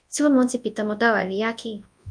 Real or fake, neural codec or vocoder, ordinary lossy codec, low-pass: fake; codec, 24 kHz, 0.9 kbps, WavTokenizer, large speech release; MP3, 64 kbps; 9.9 kHz